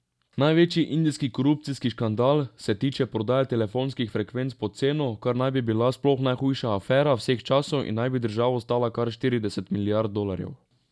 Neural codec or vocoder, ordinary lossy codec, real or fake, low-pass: none; none; real; none